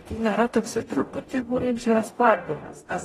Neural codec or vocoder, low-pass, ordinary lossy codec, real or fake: codec, 44.1 kHz, 0.9 kbps, DAC; 19.8 kHz; AAC, 32 kbps; fake